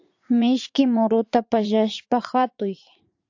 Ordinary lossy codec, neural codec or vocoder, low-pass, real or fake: AAC, 48 kbps; vocoder, 44.1 kHz, 80 mel bands, Vocos; 7.2 kHz; fake